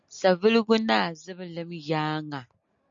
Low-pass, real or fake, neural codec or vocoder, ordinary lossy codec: 7.2 kHz; real; none; MP3, 48 kbps